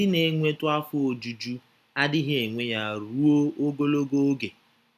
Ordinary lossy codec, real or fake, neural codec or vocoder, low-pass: none; real; none; 14.4 kHz